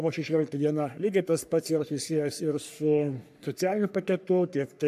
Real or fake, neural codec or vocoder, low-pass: fake; codec, 44.1 kHz, 3.4 kbps, Pupu-Codec; 14.4 kHz